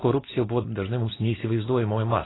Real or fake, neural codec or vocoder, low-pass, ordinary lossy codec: real; none; 7.2 kHz; AAC, 16 kbps